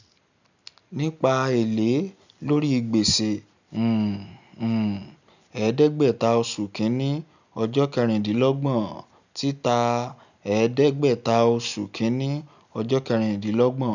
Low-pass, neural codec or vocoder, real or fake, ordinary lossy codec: 7.2 kHz; none; real; none